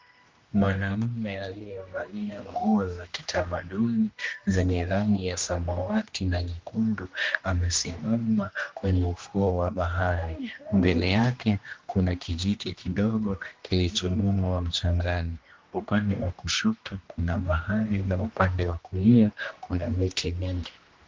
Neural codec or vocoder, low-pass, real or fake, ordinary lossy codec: codec, 16 kHz, 1 kbps, X-Codec, HuBERT features, trained on general audio; 7.2 kHz; fake; Opus, 32 kbps